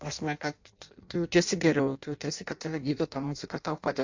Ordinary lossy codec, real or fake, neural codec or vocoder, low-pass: AAC, 48 kbps; fake; codec, 16 kHz in and 24 kHz out, 0.6 kbps, FireRedTTS-2 codec; 7.2 kHz